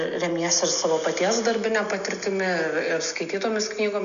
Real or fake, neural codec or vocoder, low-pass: real; none; 7.2 kHz